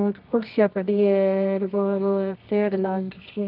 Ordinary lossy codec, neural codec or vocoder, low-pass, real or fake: none; codec, 24 kHz, 0.9 kbps, WavTokenizer, medium music audio release; 5.4 kHz; fake